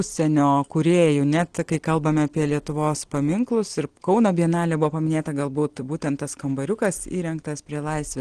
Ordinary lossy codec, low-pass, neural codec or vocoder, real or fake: Opus, 16 kbps; 9.9 kHz; none; real